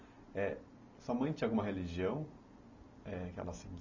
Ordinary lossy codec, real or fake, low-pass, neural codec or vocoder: none; real; 7.2 kHz; none